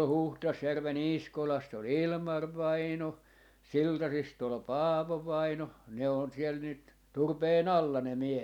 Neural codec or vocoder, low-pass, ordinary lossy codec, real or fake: none; 19.8 kHz; none; real